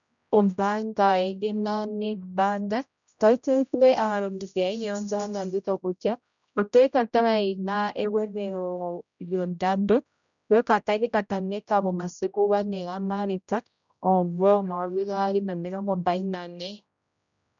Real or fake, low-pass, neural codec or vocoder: fake; 7.2 kHz; codec, 16 kHz, 0.5 kbps, X-Codec, HuBERT features, trained on general audio